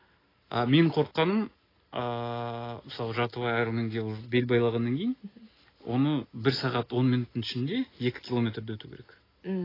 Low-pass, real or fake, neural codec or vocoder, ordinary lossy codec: 5.4 kHz; real; none; AAC, 24 kbps